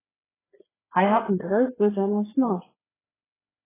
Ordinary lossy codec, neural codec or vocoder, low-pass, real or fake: AAC, 16 kbps; codec, 16 kHz, 4 kbps, FreqCodec, larger model; 3.6 kHz; fake